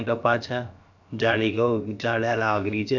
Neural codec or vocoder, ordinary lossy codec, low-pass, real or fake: codec, 16 kHz, about 1 kbps, DyCAST, with the encoder's durations; none; 7.2 kHz; fake